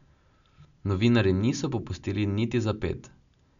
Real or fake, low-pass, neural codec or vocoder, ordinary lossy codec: real; 7.2 kHz; none; none